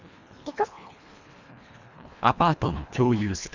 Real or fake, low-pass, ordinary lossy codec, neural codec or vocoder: fake; 7.2 kHz; none; codec, 24 kHz, 1.5 kbps, HILCodec